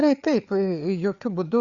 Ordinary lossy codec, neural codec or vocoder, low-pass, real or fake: Opus, 64 kbps; codec, 16 kHz, 4 kbps, FreqCodec, larger model; 7.2 kHz; fake